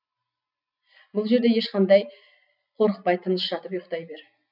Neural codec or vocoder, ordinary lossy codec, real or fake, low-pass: none; none; real; 5.4 kHz